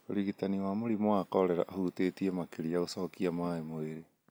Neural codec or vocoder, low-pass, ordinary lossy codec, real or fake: none; none; none; real